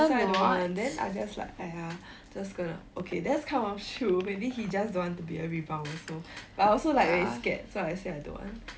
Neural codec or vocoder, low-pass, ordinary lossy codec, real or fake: none; none; none; real